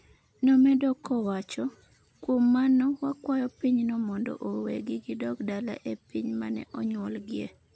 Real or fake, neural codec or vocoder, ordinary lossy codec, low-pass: real; none; none; none